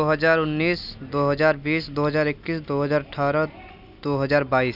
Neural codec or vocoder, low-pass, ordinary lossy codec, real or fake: none; 5.4 kHz; none; real